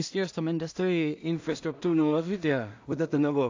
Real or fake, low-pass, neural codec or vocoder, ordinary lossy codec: fake; 7.2 kHz; codec, 16 kHz in and 24 kHz out, 0.4 kbps, LongCat-Audio-Codec, two codebook decoder; AAC, 48 kbps